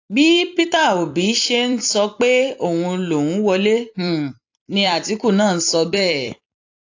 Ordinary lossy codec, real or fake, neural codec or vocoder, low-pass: AAC, 48 kbps; fake; vocoder, 44.1 kHz, 128 mel bands every 256 samples, BigVGAN v2; 7.2 kHz